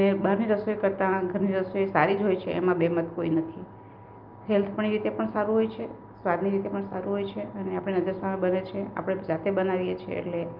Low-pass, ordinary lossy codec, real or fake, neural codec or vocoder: 5.4 kHz; Opus, 24 kbps; real; none